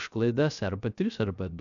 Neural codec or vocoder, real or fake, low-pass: codec, 16 kHz, 0.3 kbps, FocalCodec; fake; 7.2 kHz